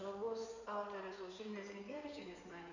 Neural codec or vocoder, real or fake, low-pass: codec, 16 kHz in and 24 kHz out, 2.2 kbps, FireRedTTS-2 codec; fake; 7.2 kHz